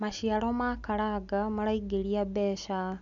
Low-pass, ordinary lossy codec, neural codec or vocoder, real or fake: 7.2 kHz; none; none; real